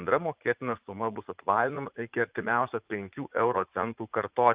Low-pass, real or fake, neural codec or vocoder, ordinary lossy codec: 3.6 kHz; fake; vocoder, 44.1 kHz, 80 mel bands, Vocos; Opus, 24 kbps